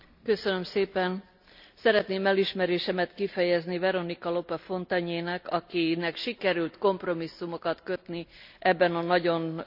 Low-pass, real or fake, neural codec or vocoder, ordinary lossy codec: 5.4 kHz; real; none; none